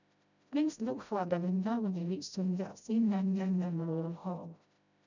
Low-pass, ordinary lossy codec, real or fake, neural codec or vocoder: 7.2 kHz; none; fake; codec, 16 kHz, 0.5 kbps, FreqCodec, smaller model